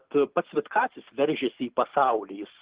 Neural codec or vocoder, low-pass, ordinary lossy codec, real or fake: none; 3.6 kHz; Opus, 16 kbps; real